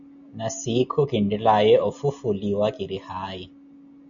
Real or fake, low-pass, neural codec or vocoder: real; 7.2 kHz; none